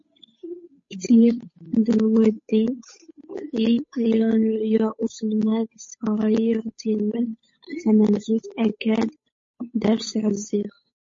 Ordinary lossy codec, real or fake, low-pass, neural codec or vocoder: MP3, 32 kbps; fake; 7.2 kHz; codec, 16 kHz, 8 kbps, FunCodec, trained on Chinese and English, 25 frames a second